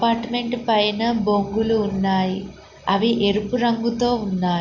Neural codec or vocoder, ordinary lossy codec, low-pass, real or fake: none; Opus, 64 kbps; 7.2 kHz; real